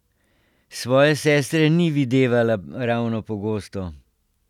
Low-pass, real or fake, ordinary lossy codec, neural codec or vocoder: 19.8 kHz; real; none; none